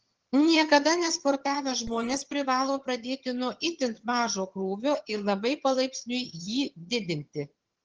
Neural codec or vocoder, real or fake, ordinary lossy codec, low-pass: vocoder, 22.05 kHz, 80 mel bands, HiFi-GAN; fake; Opus, 16 kbps; 7.2 kHz